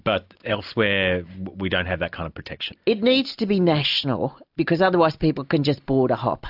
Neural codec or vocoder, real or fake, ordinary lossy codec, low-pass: none; real; AAC, 48 kbps; 5.4 kHz